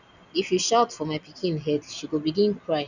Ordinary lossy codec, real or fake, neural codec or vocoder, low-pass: none; real; none; 7.2 kHz